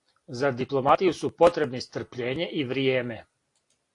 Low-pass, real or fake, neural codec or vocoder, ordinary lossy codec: 10.8 kHz; real; none; AAC, 48 kbps